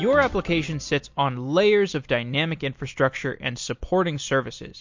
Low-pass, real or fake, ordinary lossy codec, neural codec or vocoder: 7.2 kHz; real; MP3, 48 kbps; none